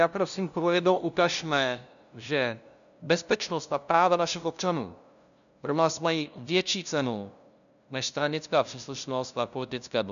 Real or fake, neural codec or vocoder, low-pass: fake; codec, 16 kHz, 0.5 kbps, FunCodec, trained on LibriTTS, 25 frames a second; 7.2 kHz